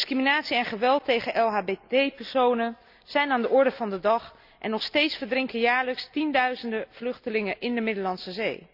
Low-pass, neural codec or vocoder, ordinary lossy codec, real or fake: 5.4 kHz; none; none; real